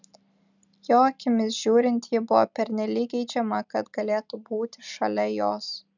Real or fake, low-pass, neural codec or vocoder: real; 7.2 kHz; none